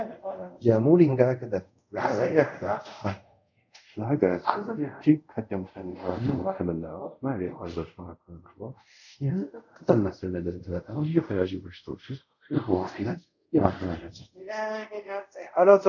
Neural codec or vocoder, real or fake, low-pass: codec, 24 kHz, 0.5 kbps, DualCodec; fake; 7.2 kHz